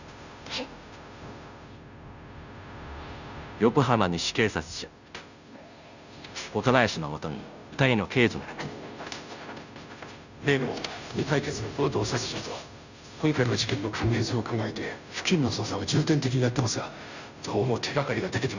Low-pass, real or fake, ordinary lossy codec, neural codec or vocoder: 7.2 kHz; fake; none; codec, 16 kHz, 0.5 kbps, FunCodec, trained on Chinese and English, 25 frames a second